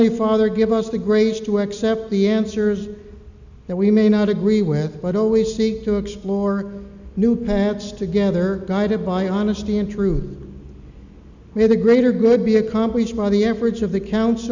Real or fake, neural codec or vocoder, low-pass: real; none; 7.2 kHz